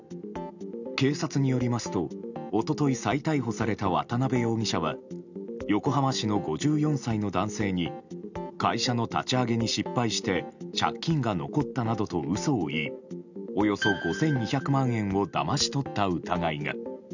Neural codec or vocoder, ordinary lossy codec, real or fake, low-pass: none; none; real; 7.2 kHz